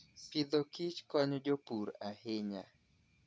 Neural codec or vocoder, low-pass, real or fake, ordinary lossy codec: none; none; real; none